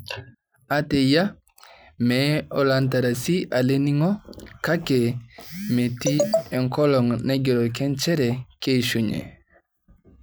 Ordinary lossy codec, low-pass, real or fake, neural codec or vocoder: none; none; real; none